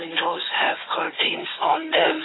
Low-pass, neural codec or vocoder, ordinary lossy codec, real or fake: 7.2 kHz; codec, 16 kHz, 4 kbps, FunCodec, trained on Chinese and English, 50 frames a second; AAC, 16 kbps; fake